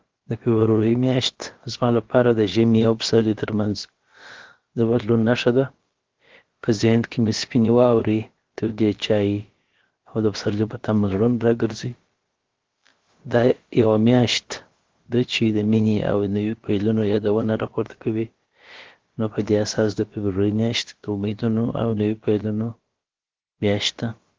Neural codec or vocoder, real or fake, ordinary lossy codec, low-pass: codec, 16 kHz, about 1 kbps, DyCAST, with the encoder's durations; fake; Opus, 16 kbps; 7.2 kHz